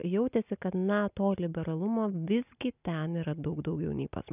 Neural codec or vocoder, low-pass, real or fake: none; 3.6 kHz; real